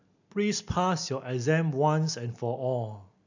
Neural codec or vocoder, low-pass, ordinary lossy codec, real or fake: none; 7.2 kHz; none; real